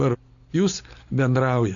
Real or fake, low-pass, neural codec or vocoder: real; 7.2 kHz; none